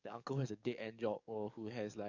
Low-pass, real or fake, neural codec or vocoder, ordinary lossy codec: 7.2 kHz; fake; codec, 44.1 kHz, 7.8 kbps, DAC; none